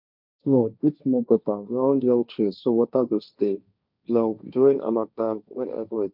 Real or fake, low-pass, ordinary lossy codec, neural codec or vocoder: fake; 5.4 kHz; none; codec, 16 kHz, 1.1 kbps, Voila-Tokenizer